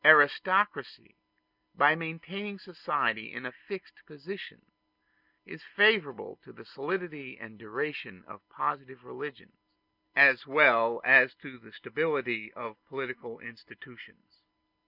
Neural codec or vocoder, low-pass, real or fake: none; 5.4 kHz; real